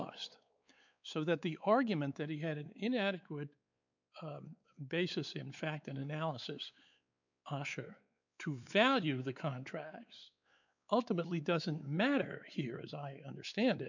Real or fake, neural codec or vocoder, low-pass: fake; codec, 16 kHz, 4 kbps, X-Codec, WavLM features, trained on Multilingual LibriSpeech; 7.2 kHz